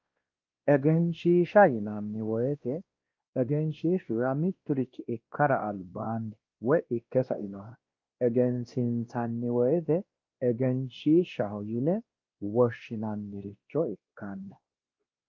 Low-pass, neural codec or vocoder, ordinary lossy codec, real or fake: 7.2 kHz; codec, 16 kHz, 1 kbps, X-Codec, WavLM features, trained on Multilingual LibriSpeech; Opus, 24 kbps; fake